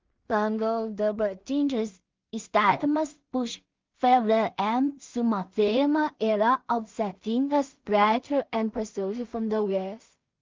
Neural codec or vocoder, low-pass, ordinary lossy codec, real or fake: codec, 16 kHz in and 24 kHz out, 0.4 kbps, LongCat-Audio-Codec, two codebook decoder; 7.2 kHz; Opus, 24 kbps; fake